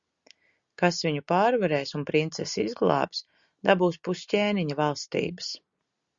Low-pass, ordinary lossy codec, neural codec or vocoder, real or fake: 7.2 kHz; Opus, 64 kbps; none; real